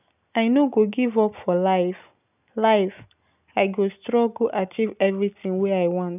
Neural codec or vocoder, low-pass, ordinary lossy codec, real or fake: none; 3.6 kHz; none; real